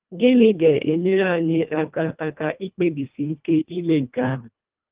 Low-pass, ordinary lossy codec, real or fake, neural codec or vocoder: 3.6 kHz; Opus, 32 kbps; fake; codec, 24 kHz, 1.5 kbps, HILCodec